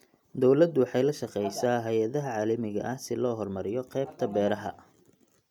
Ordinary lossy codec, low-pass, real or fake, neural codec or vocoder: none; 19.8 kHz; real; none